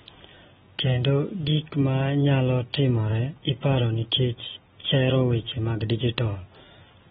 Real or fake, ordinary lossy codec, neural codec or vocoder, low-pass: real; AAC, 16 kbps; none; 19.8 kHz